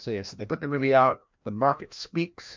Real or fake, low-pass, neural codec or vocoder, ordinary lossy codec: fake; 7.2 kHz; codec, 16 kHz, 1 kbps, FreqCodec, larger model; MP3, 64 kbps